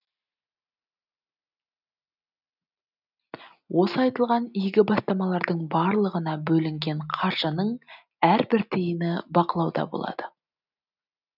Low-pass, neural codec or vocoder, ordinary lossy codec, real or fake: 5.4 kHz; none; none; real